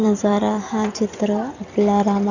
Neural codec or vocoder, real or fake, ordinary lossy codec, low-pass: vocoder, 44.1 kHz, 128 mel bands every 256 samples, BigVGAN v2; fake; none; 7.2 kHz